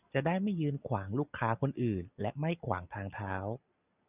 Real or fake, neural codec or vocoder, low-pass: real; none; 3.6 kHz